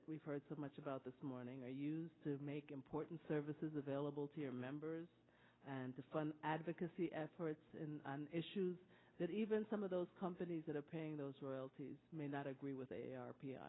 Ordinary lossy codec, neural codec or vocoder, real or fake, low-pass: AAC, 16 kbps; none; real; 7.2 kHz